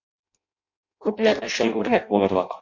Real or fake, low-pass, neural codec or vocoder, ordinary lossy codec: fake; 7.2 kHz; codec, 16 kHz in and 24 kHz out, 0.6 kbps, FireRedTTS-2 codec; MP3, 48 kbps